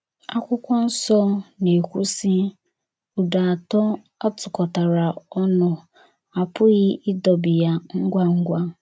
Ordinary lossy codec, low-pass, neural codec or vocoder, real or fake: none; none; none; real